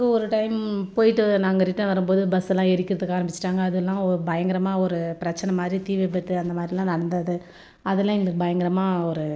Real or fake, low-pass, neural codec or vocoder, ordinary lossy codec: real; none; none; none